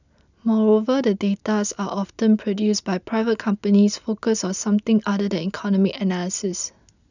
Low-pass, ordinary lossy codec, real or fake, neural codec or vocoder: 7.2 kHz; none; real; none